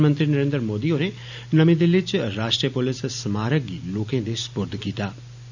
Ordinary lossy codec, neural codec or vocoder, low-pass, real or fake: none; none; 7.2 kHz; real